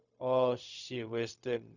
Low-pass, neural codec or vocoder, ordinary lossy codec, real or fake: 7.2 kHz; codec, 16 kHz, 0.4 kbps, LongCat-Audio-Codec; none; fake